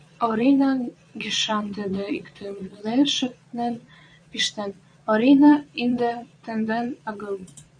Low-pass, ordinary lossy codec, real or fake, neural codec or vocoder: 9.9 kHz; MP3, 96 kbps; fake; vocoder, 22.05 kHz, 80 mel bands, Vocos